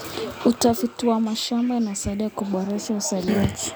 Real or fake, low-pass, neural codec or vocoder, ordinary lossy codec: real; none; none; none